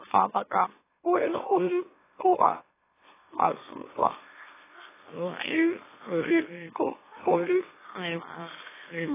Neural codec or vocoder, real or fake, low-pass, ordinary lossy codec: autoencoder, 44.1 kHz, a latent of 192 numbers a frame, MeloTTS; fake; 3.6 kHz; AAC, 16 kbps